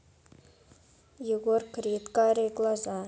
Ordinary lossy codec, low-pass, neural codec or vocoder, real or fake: none; none; none; real